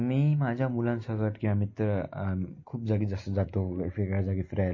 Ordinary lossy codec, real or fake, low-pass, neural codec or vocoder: MP3, 32 kbps; real; 7.2 kHz; none